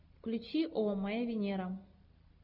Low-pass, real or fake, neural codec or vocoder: 5.4 kHz; real; none